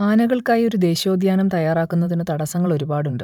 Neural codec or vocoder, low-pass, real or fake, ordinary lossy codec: vocoder, 44.1 kHz, 128 mel bands every 512 samples, BigVGAN v2; 19.8 kHz; fake; none